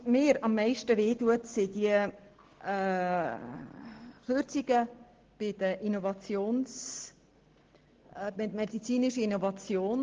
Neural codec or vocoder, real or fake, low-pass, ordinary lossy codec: none; real; 7.2 kHz; Opus, 16 kbps